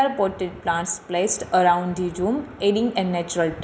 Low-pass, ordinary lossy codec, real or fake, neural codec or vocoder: none; none; real; none